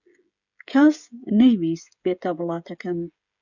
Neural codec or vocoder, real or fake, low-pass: codec, 16 kHz, 16 kbps, FreqCodec, smaller model; fake; 7.2 kHz